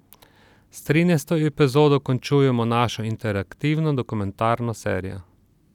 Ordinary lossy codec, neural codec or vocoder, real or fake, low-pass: none; none; real; 19.8 kHz